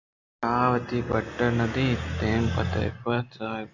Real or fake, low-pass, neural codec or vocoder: real; 7.2 kHz; none